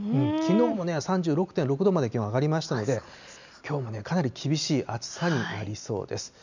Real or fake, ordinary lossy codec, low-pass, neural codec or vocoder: real; none; 7.2 kHz; none